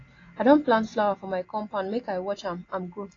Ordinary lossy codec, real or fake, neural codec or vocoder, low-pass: AAC, 32 kbps; real; none; 7.2 kHz